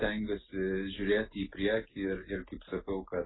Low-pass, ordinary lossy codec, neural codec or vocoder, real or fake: 7.2 kHz; AAC, 16 kbps; none; real